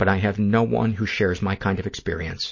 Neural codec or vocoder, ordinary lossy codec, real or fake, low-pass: none; MP3, 32 kbps; real; 7.2 kHz